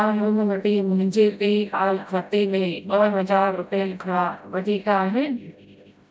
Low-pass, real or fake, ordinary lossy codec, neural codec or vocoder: none; fake; none; codec, 16 kHz, 0.5 kbps, FreqCodec, smaller model